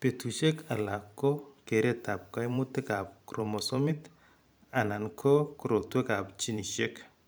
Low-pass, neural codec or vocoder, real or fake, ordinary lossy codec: none; vocoder, 44.1 kHz, 128 mel bands every 512 samples, BigVGAN v2; fake; none